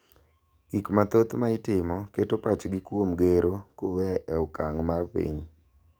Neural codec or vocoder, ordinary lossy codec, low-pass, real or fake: codec, 44.1 kHz, 7.8 kbps, DAC; none; none; fake